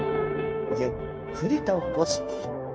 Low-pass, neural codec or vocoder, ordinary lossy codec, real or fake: none; codec, 16 kHz, 0.9 kbps, LongCat-Audio-Codec; none; fake